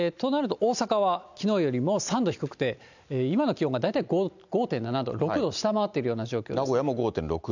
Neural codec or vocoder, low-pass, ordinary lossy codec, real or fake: none; 7.2 kHz; none; real